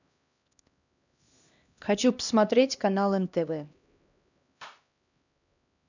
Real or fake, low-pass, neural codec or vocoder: fake; 7.2 kHz; codec, 16 kHz, 1 kbps, X-Codec, HuBERT features, trained on LibriSpeech